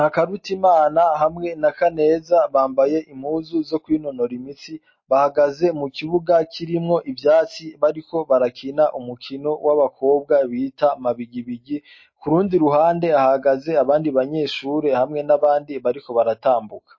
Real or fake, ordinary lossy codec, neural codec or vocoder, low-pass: real; MP3, 32 kbps; none; 7.2 kHz